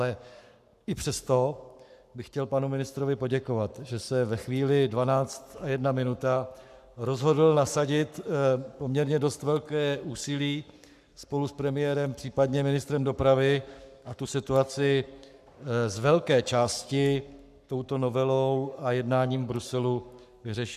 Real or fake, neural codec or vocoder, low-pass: fake; codec, 44.1 kHz, 7.8 kbps, Pupu-Codec; 14.4 kHz